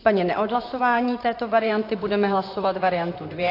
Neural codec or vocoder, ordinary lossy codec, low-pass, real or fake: vocoder, 44.1 kHz, 128 mel bands, Pupu-Vocoder; AAC, 32 kbps; 5.4 kHz; fake